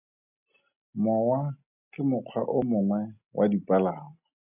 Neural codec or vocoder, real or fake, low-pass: none; real; 3.6 kHz